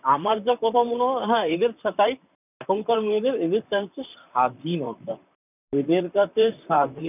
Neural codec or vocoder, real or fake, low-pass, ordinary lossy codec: vocoder, 44.1 kHz, 128 mel bands, Pupu-Vocoder; fake; 3.6 kHz; none